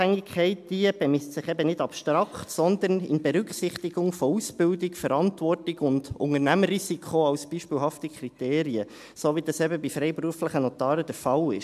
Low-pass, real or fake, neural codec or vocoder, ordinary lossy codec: 14.4 kHz; real; none; AAC, 96 kbps